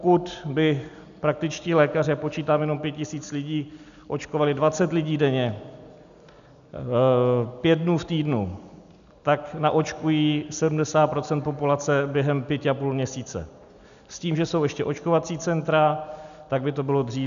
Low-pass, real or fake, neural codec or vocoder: 7.2 kHz; real; none